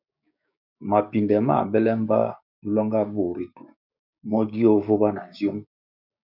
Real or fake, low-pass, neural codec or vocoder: fake; 5.4 kHz; codec, 16 kHz, 6 kbps, DAC